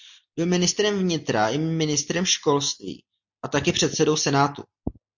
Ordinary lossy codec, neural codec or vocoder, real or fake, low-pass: MP3, 48 kbps; none; real; 7.2 kHz